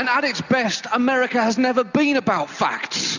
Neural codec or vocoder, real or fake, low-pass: none; real; 7.2 kHz